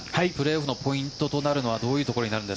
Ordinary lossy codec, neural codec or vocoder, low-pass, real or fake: none; none; none; real